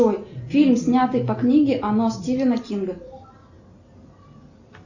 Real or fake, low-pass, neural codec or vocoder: real; 7.2 kHz; none